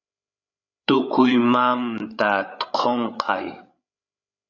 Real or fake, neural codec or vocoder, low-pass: fake; codec, 16 kHz, 8 kbps, FreqCodec, larger model; 7.2 kHz